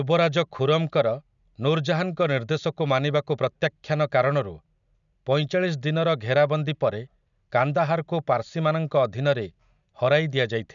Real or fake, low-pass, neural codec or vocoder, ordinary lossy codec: real; 7.2 kHz; none; none